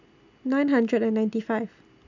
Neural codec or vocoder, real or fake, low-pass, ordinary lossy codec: none; real; 7.2 kHz; none